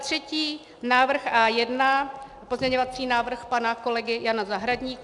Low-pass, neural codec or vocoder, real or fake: 10.8 kHz; none; real